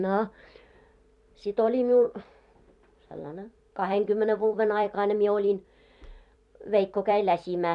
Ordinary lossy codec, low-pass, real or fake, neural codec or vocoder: none; 10.8 kHz; fake; vocoder, 44.1 kHz, 128 mel bands every 256 samples, BigVGAN v2